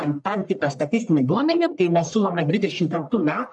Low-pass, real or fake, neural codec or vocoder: 10.8 kHz; fake; codec, 44.1 kHz, 1.7 kbps, Pupu-Codec